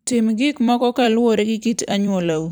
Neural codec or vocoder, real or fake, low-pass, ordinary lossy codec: vocoder, 44.1 kHz, 128 mel bands every 256 samples, BigVGAN v2; fake; none; none